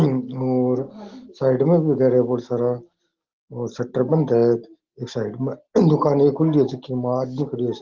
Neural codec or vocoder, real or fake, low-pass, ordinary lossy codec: none; real; 7.2 kHz; Opus, 16 kbps